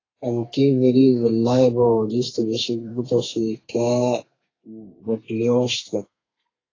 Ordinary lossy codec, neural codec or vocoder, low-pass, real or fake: AAC, 32 kbps; codec, 32 kHz, 1.9 kbps, SNAC; 7.2 kHz; fake